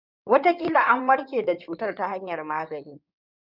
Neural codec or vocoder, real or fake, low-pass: codec, 16 kHz in and 24 kHz out, 2.2 kbps, FireRedTTS-2 codec; fake; 5.4 kHz